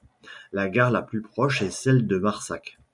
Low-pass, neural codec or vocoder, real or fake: 10.8 kHz; none; real